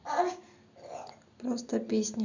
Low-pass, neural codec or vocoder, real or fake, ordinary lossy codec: 7.2 kHz; none; real; none